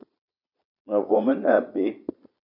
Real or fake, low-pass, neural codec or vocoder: fake; 5.4 kHz; vocoder, 22.05 kHz, 80 mel bands, Vocos